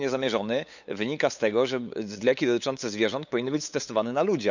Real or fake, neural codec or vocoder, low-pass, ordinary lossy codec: fake; codec, 16 kHz, 8 kbps, FunCodec, trained on LibriTTS, 25 frames a second; 7.2 kHz; none